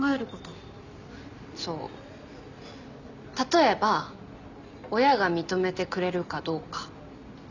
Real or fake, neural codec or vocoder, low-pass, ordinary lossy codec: real; none; 7.2 kHz; none